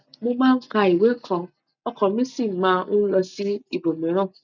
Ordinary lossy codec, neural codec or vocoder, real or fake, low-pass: none; none; real; 7.2 kHz